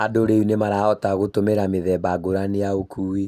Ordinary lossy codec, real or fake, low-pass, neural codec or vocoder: Opus, 64 kbps; real; 14.4 kHz; none